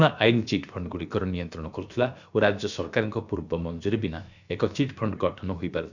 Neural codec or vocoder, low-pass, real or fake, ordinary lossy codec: codec, 16 kHz, about 1 kbps, DyCAST, with the encoder's durations; 7.2 kHz; fake; none